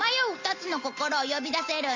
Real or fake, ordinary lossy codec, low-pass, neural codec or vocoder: real; Opus, 32 kbps; 7.2 kHz; none